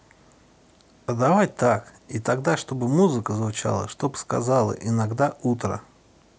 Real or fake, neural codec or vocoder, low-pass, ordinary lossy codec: real; none; none; none